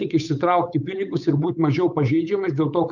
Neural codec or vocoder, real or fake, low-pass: codec, 16 kHz, 8 kbps, FunCodec, trained on Chinese and English, 25 frames a second; fake; 7.2 kHz